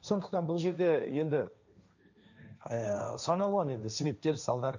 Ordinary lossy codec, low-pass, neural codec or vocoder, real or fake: none; 7.2 kHz; codec, 16 kHz, 1.1 kbps, Voila-Tokenizer; fake